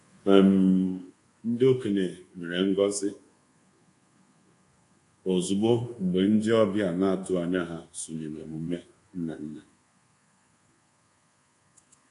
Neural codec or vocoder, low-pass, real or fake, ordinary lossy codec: codec, 24 kHz, 1.2 kbps, DualCodec; 10.8 kHz; fake; AAC, 48 kbps